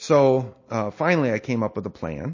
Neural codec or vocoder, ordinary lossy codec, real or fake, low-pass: none; MP3, 32 kbps; real; 7.2 kHz